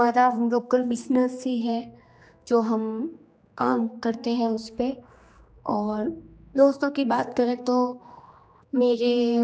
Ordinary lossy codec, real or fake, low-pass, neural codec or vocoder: none; fake; none; codec, 16 kHz, 2 kbps, X-Codec, HuBERT features, trained on general audio